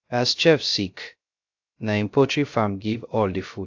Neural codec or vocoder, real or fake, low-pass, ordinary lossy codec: codec, 16 kHz, 0.3 kbps, FocalCodec; fake; 7.2 kHz; AAC, 48 kbps